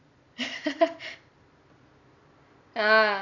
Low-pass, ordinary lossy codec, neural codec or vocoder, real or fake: 7.2 kHz; none; vocoder, 44.1 kHz, 128 mel bands every 256 samples, BigVGAN v2; fake